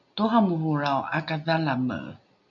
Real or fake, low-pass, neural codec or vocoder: real; 7.2 kHz; none